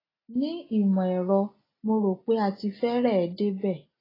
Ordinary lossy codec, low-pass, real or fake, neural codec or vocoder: AAC, 24 kbps; 5.4 kHz; real; none